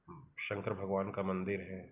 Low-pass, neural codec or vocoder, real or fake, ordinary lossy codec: 3.6 kHz; none; real; none